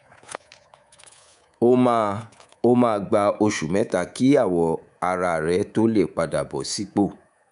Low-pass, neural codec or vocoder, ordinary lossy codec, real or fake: 10.8 kHz; codec, 24 kHz, 3.1 kbps, DualCodec; none; fake